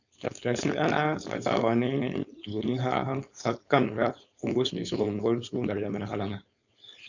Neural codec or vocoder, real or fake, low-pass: codec, 16 kHz, 4.8 kbps, FACodec; fake; 7.2 kHz